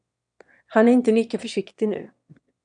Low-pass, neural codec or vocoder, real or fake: 9.9 kHz; autoencoder, 22.05 kHz, a latent of 192 numbers a frame, VITS, trained on one speaker; fake